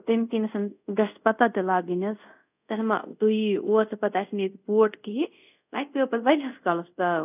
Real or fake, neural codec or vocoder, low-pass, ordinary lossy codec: fake; codec, 24 kHz, 0.5 kbps, DualCodec; 3.6 kHz; none